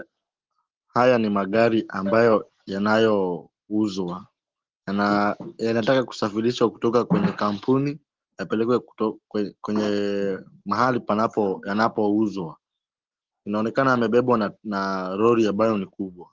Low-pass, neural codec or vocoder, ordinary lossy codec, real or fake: 7.2 kHz; none; Opus, 16 kbps; real